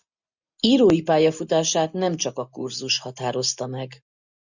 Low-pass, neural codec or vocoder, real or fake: 7.2 kHz; none; real